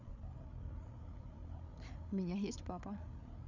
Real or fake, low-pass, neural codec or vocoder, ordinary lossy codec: fake; 7.2 kHz; codec, 16 kHz, 8 kbps, FreqCodec, larger model; none